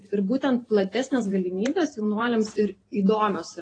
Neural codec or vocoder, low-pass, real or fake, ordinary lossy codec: vocoder, 44.1 kHz, 128 mel bands every 512 samples, BigVGAN v2; 9.9 kHz; fake; AAC, 32 kbps